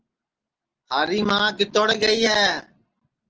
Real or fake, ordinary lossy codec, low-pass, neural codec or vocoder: real; Opus, 16 kbps; 7.2 kHz; none